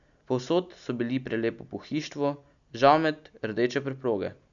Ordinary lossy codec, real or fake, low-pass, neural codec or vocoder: none; real; 7.2 kHz; none